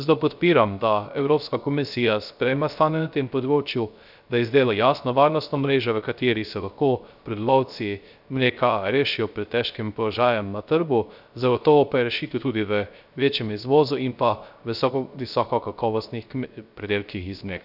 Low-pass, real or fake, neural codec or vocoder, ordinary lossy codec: 5.4 kHz; fake; codec, 16 kHz, 0.3 kbps, FocalCodec; none